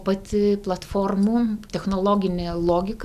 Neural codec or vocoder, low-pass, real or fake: none; 14.4 kHz; real